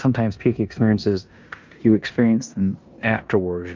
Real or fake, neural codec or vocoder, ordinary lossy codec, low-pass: fake; codec, 16 kHz in and 24 kHz out, 0.9 kbps, LongCat-Audio-Codec, four codebook decoder; Opus, 32 kbps; 7.2 kHz